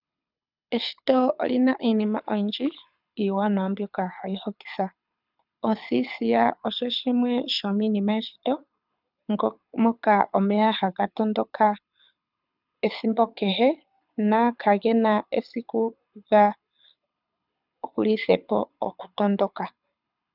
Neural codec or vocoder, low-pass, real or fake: codec, 24 kHz, 6 kbps, HILCodec; 5.4 kHz; fake